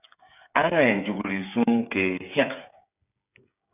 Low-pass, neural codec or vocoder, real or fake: 3.6 kHz; codec, 16 kHz, 8 kbps, FreqCodec, smaller model; fake